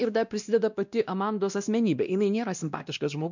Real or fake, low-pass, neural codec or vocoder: fake; 7.2 kHz; codec, 16 kHz, 1 kbps, X-Codec, WavLM features, trained on Multilingual LibriSpeech